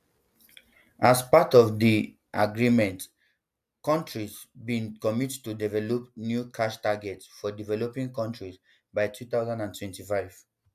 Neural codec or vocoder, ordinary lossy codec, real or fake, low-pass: none; AAC, 96 kbps; real; 14.4 kHz